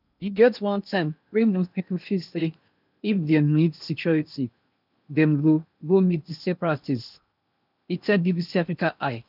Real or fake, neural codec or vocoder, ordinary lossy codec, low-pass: fake; codec, 16 kHz in and 24 kHz out, 0.8 kbps, FocalCodec, streaming, 65536 codes; none; 5.4 kHz